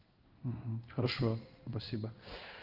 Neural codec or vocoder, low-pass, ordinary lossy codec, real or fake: codec, 16 kHz in and 24 kHz out, 1 kbps, XY-Tokenizer; 5.4 kHz; Opus, 24 kbps; fake